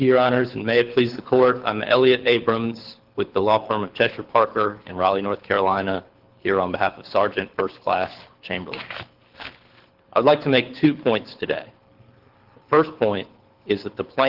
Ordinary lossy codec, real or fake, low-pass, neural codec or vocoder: Opus, 16 kbps; fake; 5.4 kHz; codec, 24 kHz, 6 kbps, HILCodec